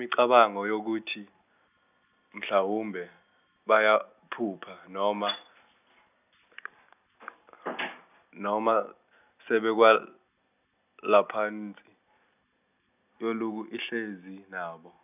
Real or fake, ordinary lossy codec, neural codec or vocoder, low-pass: real; none; none; 3.6 kHz